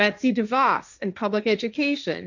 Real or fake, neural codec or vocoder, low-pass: fake; codec, 16 kHz, 1.1 kbps, Voila-Tokenizer; 7.2 kHz